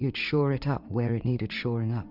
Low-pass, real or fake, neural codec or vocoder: 5.4 kHz; fake; vocoder, 44.1 kHz, 80 mel bands, Vocos